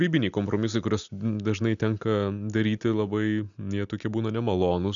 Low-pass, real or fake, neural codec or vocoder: 7.2 kHz; real; none